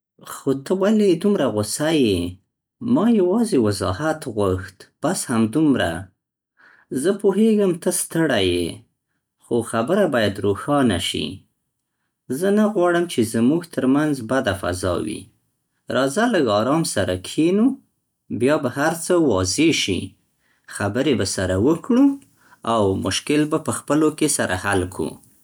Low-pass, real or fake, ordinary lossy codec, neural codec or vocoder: none; real; none; none